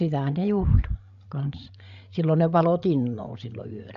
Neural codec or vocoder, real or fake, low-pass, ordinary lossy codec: codec, 16 kHz, 16 kbps, FreqCodec, larger model; fake; 7.2 kHz; MP3, 96 kbps